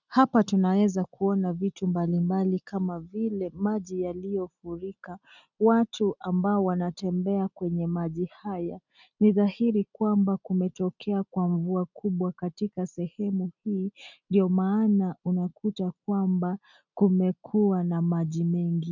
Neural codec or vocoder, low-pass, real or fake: none; 7.2 kHz; real